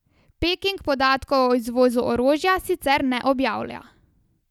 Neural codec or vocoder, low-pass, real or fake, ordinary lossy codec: none; 19.8 kHz; real; none